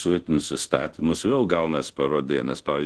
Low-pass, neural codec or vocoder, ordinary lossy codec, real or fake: 10.8 kHz; codec, 24 kHz, 0.5 kbps, DualCodec; Opus, 16 kbps; fake